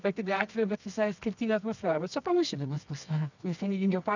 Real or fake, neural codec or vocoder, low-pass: fake; codec, 24 kHz, 0.9 kbps, WavTokenizer, medium music audio release; 7.2 kHz